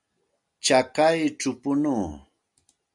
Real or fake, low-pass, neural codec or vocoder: real; 10.8 kHz; none